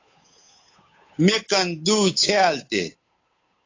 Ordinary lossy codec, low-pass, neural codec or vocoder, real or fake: AAC, 32 kbps; 7.2 kHz; codec, 16 kHz, 8 kbps, FunCodec, trained on Chinese and English, 25 frames a second; fake